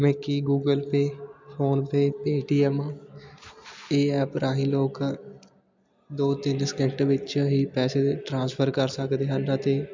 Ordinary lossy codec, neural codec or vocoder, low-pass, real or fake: MP3, 64 kbps; none; 7.2 kHz; real